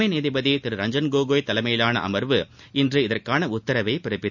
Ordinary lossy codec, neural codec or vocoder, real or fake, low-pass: none; none; real; 7.2 kHz